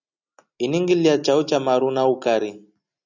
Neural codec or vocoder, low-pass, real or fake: none; 7.2 kHz; real